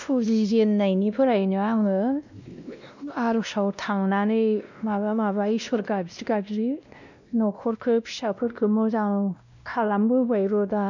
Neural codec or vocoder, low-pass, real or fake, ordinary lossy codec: codec, 16 kHz, 1 kbps, X-Codec, WavLM features, trained on Multilingual LibriSpeech; 7.2 kHz; fake; none